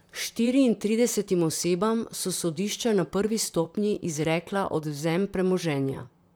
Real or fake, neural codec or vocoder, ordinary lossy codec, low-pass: fake; vocoder, 44.1 kHz, 128 mel bands, Pupu-Vocoder; none; none